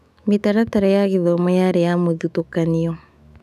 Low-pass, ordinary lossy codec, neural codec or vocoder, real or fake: 14.4 kHz; none; autoencoder, 48 kHz, 128 numbers a frame, DAC-VAE, trained on Japanese speech; fake